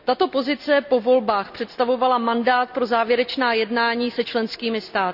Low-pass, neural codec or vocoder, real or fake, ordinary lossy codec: 5.4 kHz; none; real; none